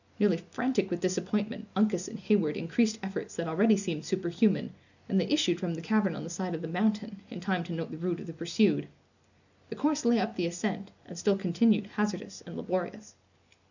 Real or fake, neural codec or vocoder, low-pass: real; none; 7.2 kHz